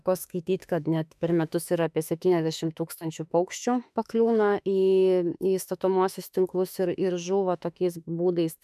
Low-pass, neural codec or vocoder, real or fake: 14.4 kHz; autoencoder, 48 kHz, 32 numbers a frame, DAC-VAE, trained on Japanese speech; fake